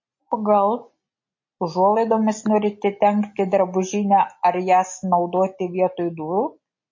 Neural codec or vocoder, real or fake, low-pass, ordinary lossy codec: none; real; 7.2 kHz; MP3, 32 kbps